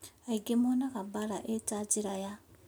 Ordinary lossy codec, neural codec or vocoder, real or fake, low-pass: none; none; real; none